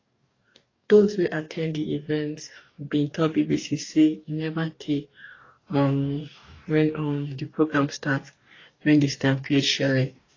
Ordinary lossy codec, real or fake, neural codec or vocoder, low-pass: AAC, 32 kbps; fake; codec, 44.1 kHz, 2.6 kbps, DAC; 7.2 kHz